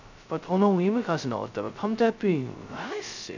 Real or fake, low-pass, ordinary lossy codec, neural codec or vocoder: fake; 7.2 kHz; none; codec, 16 kHz, 0.2 kbps, FocalCodec